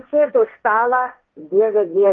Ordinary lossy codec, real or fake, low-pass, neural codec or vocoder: Opus, 32 kbps; fake; 7.2 kHz; codec, 16 kHz, 1.1 kbps, Voila-Tokenizer